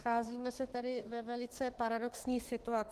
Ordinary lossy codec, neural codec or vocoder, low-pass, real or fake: Opus, 16 kbps; autoencoder, 48 kHz, 32 numbers a frame, DAC-VAE, trained on Japanese speech; 14.4 kHz; fake